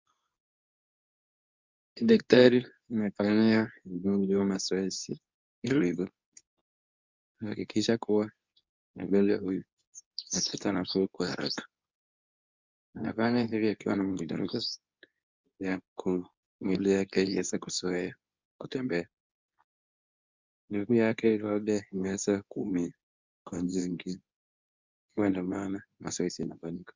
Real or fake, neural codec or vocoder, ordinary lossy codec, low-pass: fake; codec, 24 kHz, 0.9 kbps, WavTokenizer, medium speech release version 2; MP3, 64 kbps; 7.2 kHz